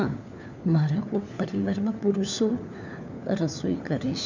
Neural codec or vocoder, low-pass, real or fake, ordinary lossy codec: codec, 16 kHz, 4 kbps, FunCodec, trained on LibriTTS, 50 frames a second; 7.2 kHz; fake; none